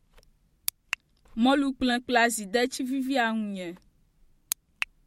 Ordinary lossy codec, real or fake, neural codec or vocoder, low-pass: MP3, 64 kbps; fake; vocoder, 44.1 kHz, 128 mel bands every 512 samples, BigVGAN v2; 19.8 kHz